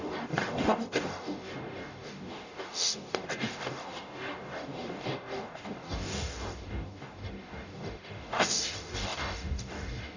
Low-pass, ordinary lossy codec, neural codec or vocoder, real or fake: 7.2 kHz; none; codec, 44.1 kHz, 0.9 kbps, DAC; fake